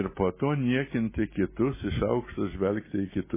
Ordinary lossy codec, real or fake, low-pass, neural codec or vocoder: MP3, 16 kbps; real; 3.6 kHz; none